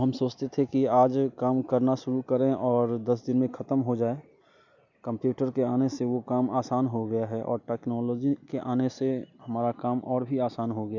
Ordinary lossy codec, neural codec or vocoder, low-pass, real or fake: none; none; 7.2 kHz; real